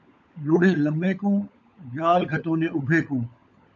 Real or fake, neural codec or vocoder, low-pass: fake; codec, 16 kHz, 16 kbps, FunCodec, trained on LibriTTS, 50 frames a second; 7.2 kHz